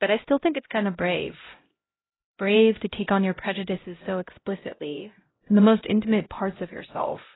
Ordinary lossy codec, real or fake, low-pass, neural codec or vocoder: AAC, 16 kbps; fake; 7.2 kHz; codec, 16 kHz, 0.5 kbps, X-Codec, HuBERT features, trained on LibriSpeech